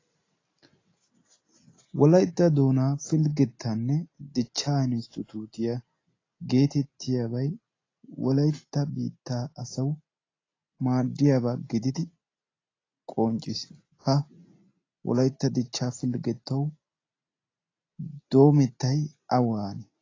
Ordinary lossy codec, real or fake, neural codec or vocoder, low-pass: AAC, 32 kbps; real; none; 7.2 kHz